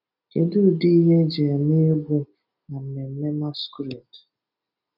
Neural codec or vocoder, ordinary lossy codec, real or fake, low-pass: none; none; real; 5.4 kHz